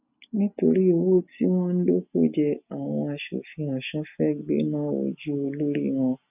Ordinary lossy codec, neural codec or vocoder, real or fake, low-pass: none; none; real; 3.6 kHz